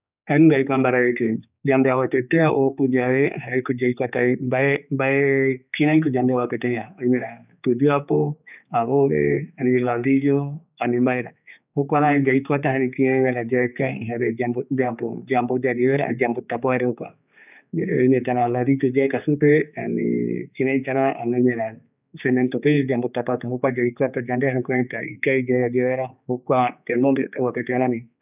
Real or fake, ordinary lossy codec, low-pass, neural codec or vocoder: fake; none; 3.6 kHz; codec, 16 kHz, 4 kbps, X-Codec, HuBERT features, trained on general audio